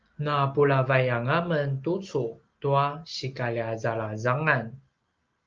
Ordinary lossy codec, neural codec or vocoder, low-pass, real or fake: Opus, 24 kbps; none; 7.2 kHz; real